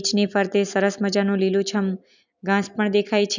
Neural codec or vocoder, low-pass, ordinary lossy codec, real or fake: none; 7.2 kHz; none; real